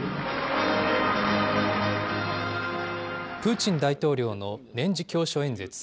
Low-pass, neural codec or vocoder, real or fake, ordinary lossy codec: none; none; real; none